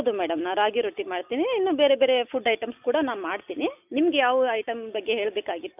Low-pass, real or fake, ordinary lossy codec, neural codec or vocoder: 3.6 kHz; real; none; none